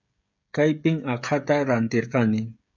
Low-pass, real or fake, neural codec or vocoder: 7.2 kHz; fake; codec, 16 kHz, 16 kbps, FreqCodec, smaller model